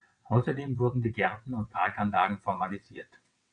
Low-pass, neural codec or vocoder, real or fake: 9.9 kHz; vocoder, 22.05 kHz, 80 mel bands, WaveNeXt; fake